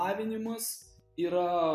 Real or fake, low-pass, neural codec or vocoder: real; 14.4 kHz; none